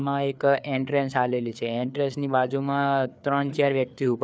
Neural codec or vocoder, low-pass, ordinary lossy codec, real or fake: codec, 16 kHz, 4 kbps, FreqCodec, larger model; none; none; fake